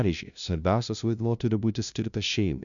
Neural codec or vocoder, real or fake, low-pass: codec, 16 kHz, 0.5 kbps, FunCodec, trained on LibriTTS, 25 frames a second; fake; 7.2 kHz